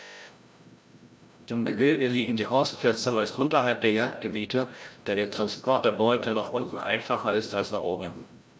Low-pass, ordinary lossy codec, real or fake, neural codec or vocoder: none; none; fake; codec, 16 kHz, 0.5 kbps, FreqCodec, larger model